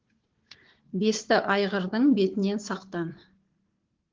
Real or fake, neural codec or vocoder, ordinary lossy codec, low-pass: fake; codec, 16 kHz, 4 kbps, FunCodec, trained on Chinese and English, 50 frames a second; Opus, 16 kbps; 7.2 kHz